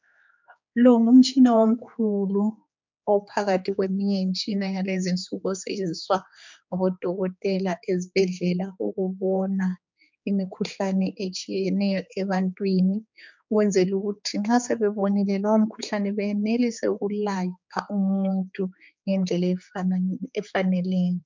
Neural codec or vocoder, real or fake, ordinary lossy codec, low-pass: codec, 16 kHz, 4 kbps, X-Codec, HuBERT features, trained on general audio; fake; MP3, 64 kbps; 7.2 kHz